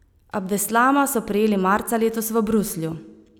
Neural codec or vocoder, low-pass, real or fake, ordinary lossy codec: none; none; real; none